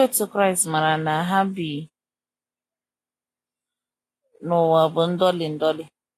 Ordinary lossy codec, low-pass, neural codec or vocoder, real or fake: AAC, 48 kbps; 14.4 kHz; none; real